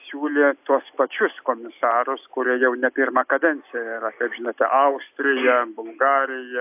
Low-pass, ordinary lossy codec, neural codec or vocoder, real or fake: 3.6 kHz; AAC, 32 kbps; none; real